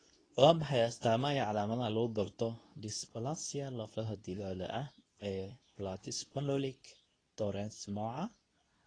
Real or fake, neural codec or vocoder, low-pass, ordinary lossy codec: fake; codec, 24 kHz, 0.9 kbps, WavTokenizer, medium speech release version 2; 9.9 kHz; AAC, 32 kbps